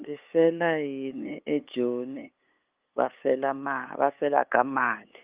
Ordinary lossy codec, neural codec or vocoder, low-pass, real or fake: Opus, 32 kbps; codec, 16 kHz, 8 kbps, FunCodec, trained on LibriTTS, 25 frames a second; 3.6 kHz; fake